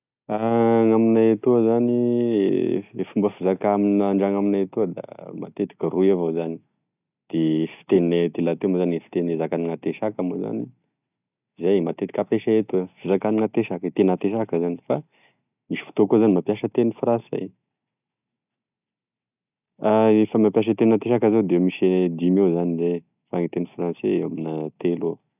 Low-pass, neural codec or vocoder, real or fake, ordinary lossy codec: 3.6 kHz; none; real; none